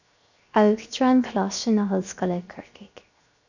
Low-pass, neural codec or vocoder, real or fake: 7.2 kHz; codec, 16 kHz, 0.7 kbps, FocalCodec; fake